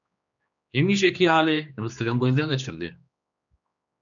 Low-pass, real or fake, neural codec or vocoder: 7.2 kHz; fake; codec, 16 kHz, 2 kbps, X-Codec, HuBERT features, trained on general audio